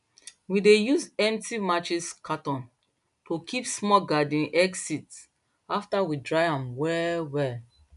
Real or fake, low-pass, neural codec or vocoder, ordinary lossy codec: real; 10.8 kHz; none; none